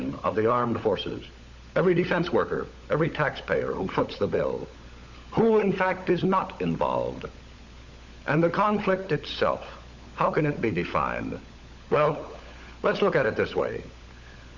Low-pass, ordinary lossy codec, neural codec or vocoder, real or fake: 7.2 kHz; Opus, 64 kbps; codec, 16 kHz, 16 kbps, FunCodec, trained on LibriTTS, 50 frames a second; fake